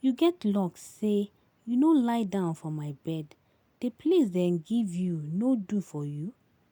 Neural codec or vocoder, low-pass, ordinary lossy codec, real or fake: none; 19.8 kHz; none; real